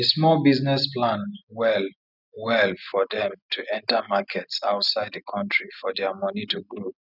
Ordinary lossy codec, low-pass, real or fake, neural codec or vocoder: none; 5.4 kHz; real; none